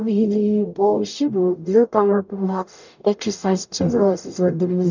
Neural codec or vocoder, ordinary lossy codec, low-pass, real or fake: codec, 44.1 kHz, 0.9 kbps, DAC; none; 7.2 kHz; fake